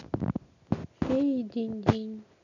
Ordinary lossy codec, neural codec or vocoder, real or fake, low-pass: none; none; real; 7.2 kHz